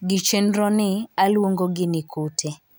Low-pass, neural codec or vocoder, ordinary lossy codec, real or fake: none; none; none; real